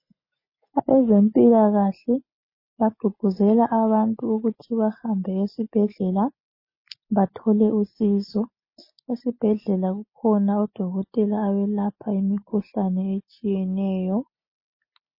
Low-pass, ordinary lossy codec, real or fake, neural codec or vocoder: 5.4 kHz; MP3, 24 kbps; real; none